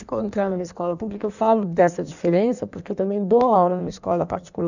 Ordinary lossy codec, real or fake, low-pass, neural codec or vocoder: none; fake; 7.2 kHz; codec, 16 kHz in and 24 kHz out, 1.1 kbps, FireRedTTS-2 codec